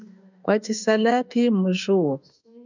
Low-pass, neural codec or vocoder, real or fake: 7.2 kHz; autoencoder, 48 kHz, 32 numbers a frame, DAC-VAE, trained on Japanese speech; fake